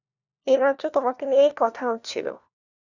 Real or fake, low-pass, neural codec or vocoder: fake; 7.2 kHz; codec, 16 kHz, 1 kbps, FunCodec, trained on LibriTTS, 50 frames a second